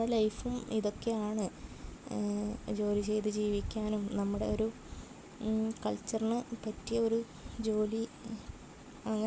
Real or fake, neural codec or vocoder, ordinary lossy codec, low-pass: real; none; none; none